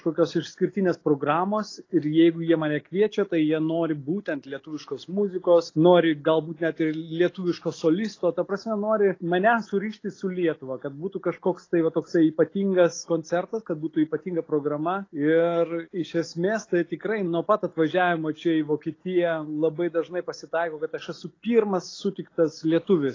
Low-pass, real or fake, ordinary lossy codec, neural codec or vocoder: 7.2 kHz; real; AAC, 32 kbps; none